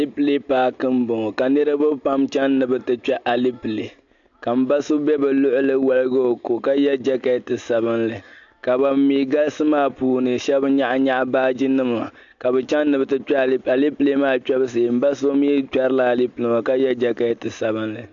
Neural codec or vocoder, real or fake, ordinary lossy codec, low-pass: none; real; AAC, 64 kbps; 7.2 kHz